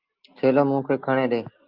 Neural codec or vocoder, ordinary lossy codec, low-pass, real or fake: none; Opus, 24 kbps; 5.4 kHz; real